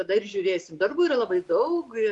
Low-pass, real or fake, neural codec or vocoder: 10.8 kHz; real; none